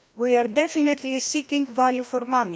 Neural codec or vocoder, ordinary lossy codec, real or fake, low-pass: codec, 16 kHz, 1 kbps, FreqCodec, larger model; none; fake; none